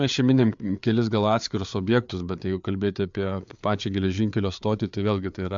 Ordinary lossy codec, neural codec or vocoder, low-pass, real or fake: MP3, 64 kbps; codec, 16 kHz, 4 kbps, FreqCodec, larger model; 7.2 kHz; fake